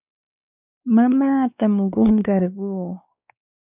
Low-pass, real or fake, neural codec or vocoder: 3.6 kHz; fake; codec, 16 kHz, 2 kbps, X-Codec, WavLM features, trained on Multilingual LibriSpeech